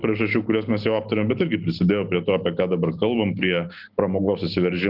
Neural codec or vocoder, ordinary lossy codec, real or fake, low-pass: none; Opus, 24 kbps; real; 5.4 kHz